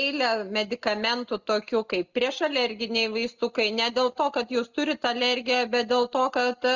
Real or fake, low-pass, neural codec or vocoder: real; 7.2 kHz; none